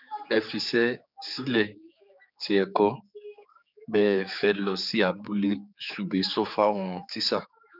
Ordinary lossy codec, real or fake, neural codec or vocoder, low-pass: none; fake; codec, 16 kHz, 4 kbps, X-Codec, HuBERT features, trained on general audio; 5.4 kHz